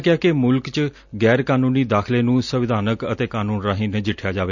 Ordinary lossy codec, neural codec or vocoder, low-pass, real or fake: none; none; 7.2 kHz; real